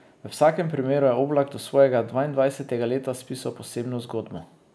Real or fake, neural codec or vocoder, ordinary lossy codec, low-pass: real; none; none; none